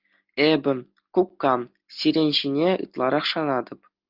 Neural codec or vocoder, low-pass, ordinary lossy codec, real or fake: none; 5.4 kHz; Opus, 24 kbps; real